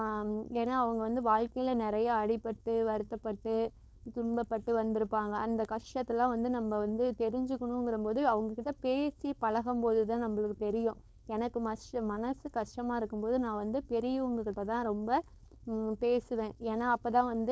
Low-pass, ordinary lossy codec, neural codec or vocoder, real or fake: none; none; codec, 16 kHz, 4.8 kbps, FACodec; fake